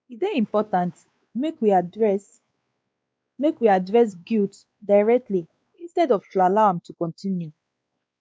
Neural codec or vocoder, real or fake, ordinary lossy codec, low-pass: codec, 16 kHz, 2 kbps, X-Codec, WavLM features, trained on Multilingual LibriSpeech; fake; none; none